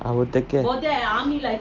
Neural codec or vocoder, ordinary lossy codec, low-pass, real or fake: none; Opus, 32 kbps; 7.2 kHz; real